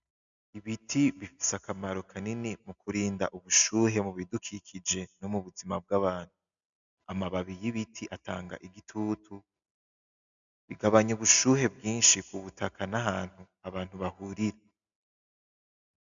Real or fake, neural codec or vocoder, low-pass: real; none; 7.2 kHz